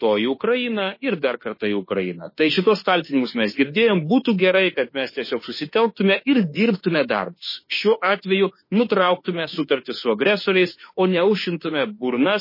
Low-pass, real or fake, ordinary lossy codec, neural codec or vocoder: 5.4 kHz; fake; MP3, 24 kbps; autoencoder, 48 kHz, 32 numbers a frame, DAC-VAE, trained on Japanese speech